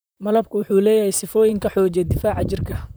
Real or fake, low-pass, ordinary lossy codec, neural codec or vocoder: fake; none; none; vocoder, 44.1 kHz, 128 mel bands, Pupu-Vocoder